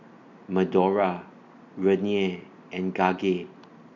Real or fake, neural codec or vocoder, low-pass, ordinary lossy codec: real; none; 7.2 kHz; none